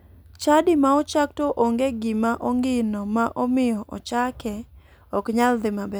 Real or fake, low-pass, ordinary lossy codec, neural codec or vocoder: real; none; none; none